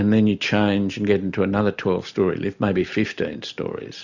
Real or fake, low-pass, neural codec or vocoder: real; 7.2 kHz; none